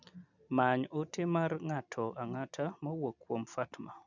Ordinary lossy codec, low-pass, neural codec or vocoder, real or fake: none; 7.2 kHz; none; real